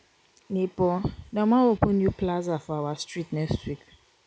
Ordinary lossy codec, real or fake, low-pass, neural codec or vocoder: none; real; none; none